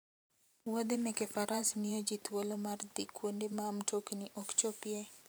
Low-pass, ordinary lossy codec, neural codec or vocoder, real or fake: none; none; vocoder, 44.1 kHz, 128 mel bands every 512 samples, BigVGAN v2; fake